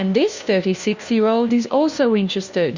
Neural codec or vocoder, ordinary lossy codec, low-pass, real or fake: codec, 16 kHz, 1 kbps, FunCodec, trained on LibriTTS, 50 frames a second; Opus, 64 kbps; 7.2 kHz; fake